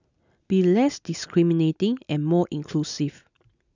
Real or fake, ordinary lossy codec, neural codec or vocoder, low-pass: real; none; none; 7.2 kHz